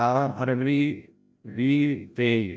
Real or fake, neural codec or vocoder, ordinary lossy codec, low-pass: fake; codec, 16 kHz, 0.5 kbps, FreqCodec, larger model; none; none